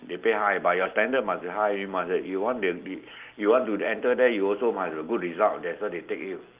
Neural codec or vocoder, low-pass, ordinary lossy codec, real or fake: none; 3.6 kHz; Opus, 32 kbps; real